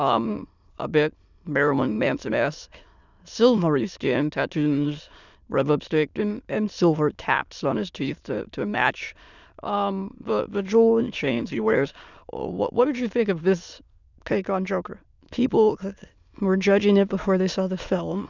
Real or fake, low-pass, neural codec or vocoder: fake; 7.2 kHz; autoencoder, 22.05 kHz, a latent of 192 numbers a frame, VITS, trained on many speakers